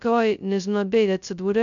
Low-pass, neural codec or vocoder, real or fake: 7.2 kHz; codec, 16 kHz, 0.2 kbps, FocalCodec; fake